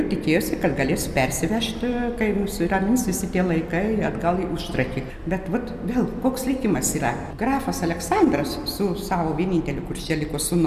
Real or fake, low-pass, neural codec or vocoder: real; 14.4 kHz; none